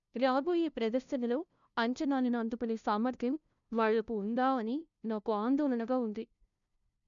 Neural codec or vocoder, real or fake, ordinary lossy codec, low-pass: codec, 16 kHz, 0.5 kbps, FunCodec, trained on LibriTTS, 25 frames a second; fake; none; 7.2 kHz